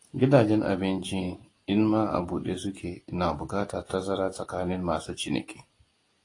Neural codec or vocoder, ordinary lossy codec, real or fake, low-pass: none; AAC, 32 kbps; real; 10.8 kHz